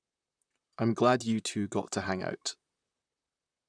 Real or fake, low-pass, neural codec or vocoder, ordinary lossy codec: fake; 9.9 kHz; vocoder, 44.1 kHz, 128 mel bands, Pupu-Vocoder; none